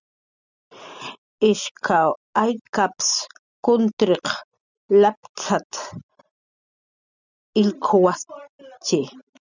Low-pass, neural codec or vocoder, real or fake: 7.2 kHz; none; real